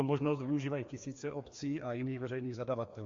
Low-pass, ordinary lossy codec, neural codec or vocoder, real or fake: 7.2 kHz; MP3, 48 kbps; codec, 16 kHz, 2 kbps, FreqCodec, larger model; fake